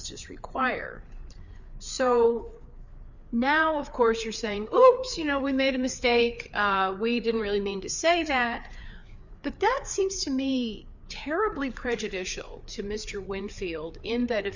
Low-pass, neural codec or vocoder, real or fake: 7.2 kHz; codec, 16 kHz, 4 kbps, FreqCodec, larger model; fake